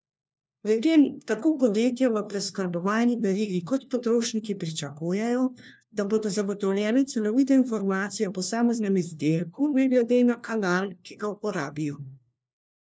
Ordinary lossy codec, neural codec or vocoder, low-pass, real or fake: none; codec, 16 kHz, 1 kbps, FunCodec, trained on LibriTTS, 50 frames a second; none; fake